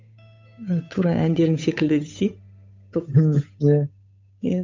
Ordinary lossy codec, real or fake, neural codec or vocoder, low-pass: MP3, 64 kbps; fake; codec, 16 kHz, 8 kbps, FunCodec, trained on Chinese and English, 25 frames a second; 7.2 kHz